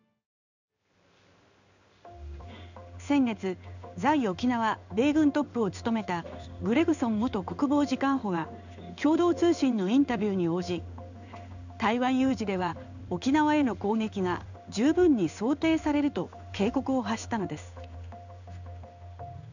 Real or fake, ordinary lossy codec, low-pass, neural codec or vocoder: fake; none; 7.2 kHz; codec, 16 kHz in and 24 kHz out, 1 kbps, XY-Tokenizer